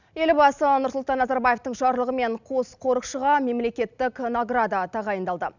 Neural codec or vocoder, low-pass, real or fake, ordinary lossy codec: none; 7.2 kHz; real; none